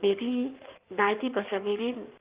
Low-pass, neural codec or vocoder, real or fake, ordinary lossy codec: 3.6 kHz; codec, 16 kHz in and 24 kHz out, 1.1 kbps, FireRedTTS-2 codec; fake; Opus, 24 kbps